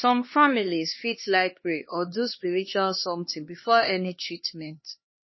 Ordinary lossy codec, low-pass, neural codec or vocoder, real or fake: MP3, 24 kbps; 7.2 kHz; codec, 16 kHz, 2 kbps, X-Codec, HuBERT features, trained on LibriSpeech; fake